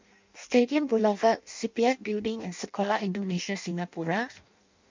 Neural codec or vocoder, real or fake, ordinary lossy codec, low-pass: codec, 16 kHz in and 24 kHz out, 0.6 kbps, FireRedTTS-2 codec; fake; MP3, 64 kbps; 7.2 kHz